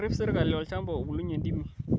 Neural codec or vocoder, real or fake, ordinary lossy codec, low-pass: none; real; none; none